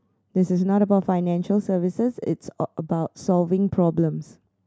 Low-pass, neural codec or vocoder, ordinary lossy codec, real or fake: none; none; none; real